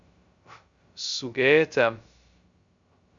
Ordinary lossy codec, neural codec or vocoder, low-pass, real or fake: Opus, 64 kbps; codec, 16 kHz, 0.2 kbps, FocalCodec; 7.2 kHz; fake